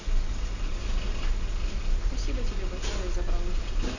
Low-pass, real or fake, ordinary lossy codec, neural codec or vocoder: 7.2 kHz; real; none; none